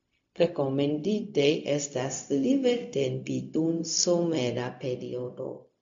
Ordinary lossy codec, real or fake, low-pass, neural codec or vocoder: MP3, 48 kbps; fake; 7.2 kHz; codec, 16 kHz, 0.4 kbps, LongCat-Audio-Codec